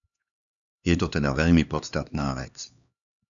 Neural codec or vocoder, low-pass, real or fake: codec, 16 kHz, 2 kbps, X-Codec, HuBERT features, trained on LibriSpeech; 7.2 kHz; fake